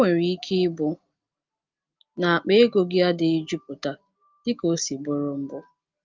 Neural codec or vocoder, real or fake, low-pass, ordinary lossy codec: none; real; 7.2 kHz; Opus, 24 kbps